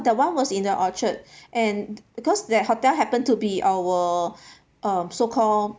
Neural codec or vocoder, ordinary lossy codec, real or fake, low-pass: none; none; real; none